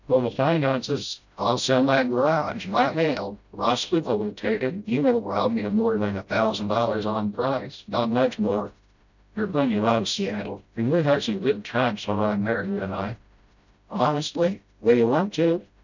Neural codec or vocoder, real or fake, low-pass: codec, 16 kHz, 0.5 kbps, FreqCodec, smaller model; fake; 7.2 kHz